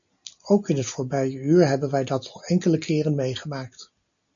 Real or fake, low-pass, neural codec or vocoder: real; 7.2 kHz; none